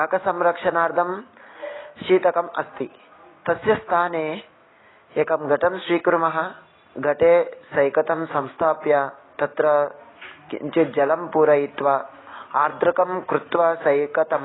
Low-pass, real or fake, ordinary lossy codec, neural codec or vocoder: 7.2 kHz; real; AAC, 16 kbps; none